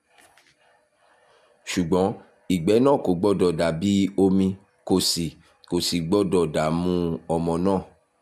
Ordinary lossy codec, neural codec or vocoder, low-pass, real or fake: MP3, 96 kbps; none; 14.4 kHz; real